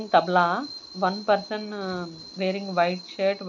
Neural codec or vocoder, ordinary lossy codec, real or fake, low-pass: none; none; real; 7.2 kHz